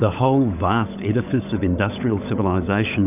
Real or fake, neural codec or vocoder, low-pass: fake; codec, 16 kHz, 16 kbps, FunCodec, trained on LibriTTS, 50 frames a second; 3.6 kHz